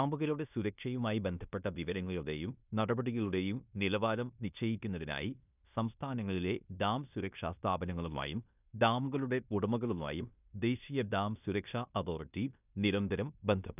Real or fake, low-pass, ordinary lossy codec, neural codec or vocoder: fake; 3.6 kHz; none; codec, 16 kHz in and 24 kHz out, 0.9 kbps, LongCat-Audio-Codec, fine tuned four codebook decoder